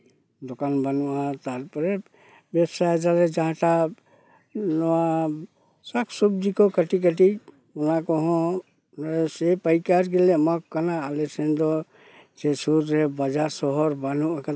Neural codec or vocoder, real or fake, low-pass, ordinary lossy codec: none; real; none; none